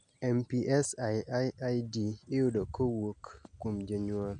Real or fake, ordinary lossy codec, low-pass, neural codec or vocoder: real; none; 9.9 kHz; none